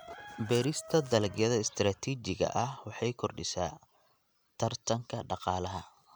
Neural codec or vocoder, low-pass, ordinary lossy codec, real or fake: none; none; none; real